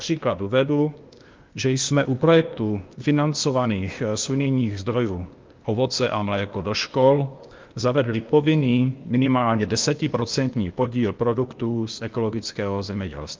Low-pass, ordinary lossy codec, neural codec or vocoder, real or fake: 7.2 kHz; Opus, 32 kbps; codec, 16 kHz, 0.8 kbps, ZipCodec; fake